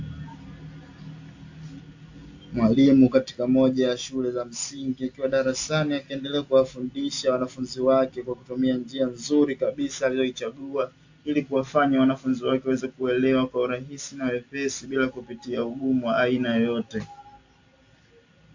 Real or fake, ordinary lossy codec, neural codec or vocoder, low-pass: real; AAC, 48 kbps; none; 7.2 kHz